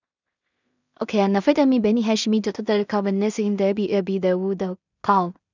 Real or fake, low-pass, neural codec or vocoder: fake; 7.2 kHz; codec, 16 kHz in and 24 kHz out, 0.4 kbps, LongCat-Audio-Codec, two codebook decoder